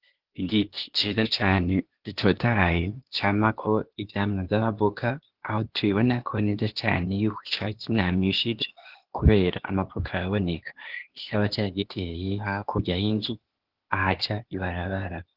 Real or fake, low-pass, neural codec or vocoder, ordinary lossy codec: fake; 5.4 kHz; codec, 16 kHz, 0.8 kbps, ZipCodec; Opus, 16 kbps